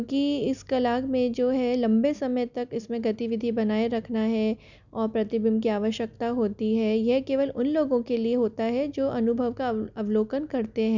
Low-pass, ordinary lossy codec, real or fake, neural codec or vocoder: 7.2 kHz; none; real; none